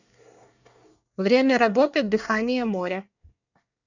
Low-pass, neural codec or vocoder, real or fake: 7.2 kHz; codec, 24 kHz, 1 kbps, SNAC; fake